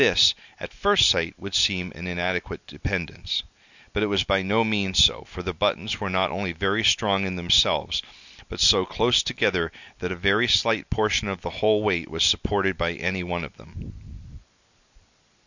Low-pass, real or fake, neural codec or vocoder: 7.2 kHz; real; none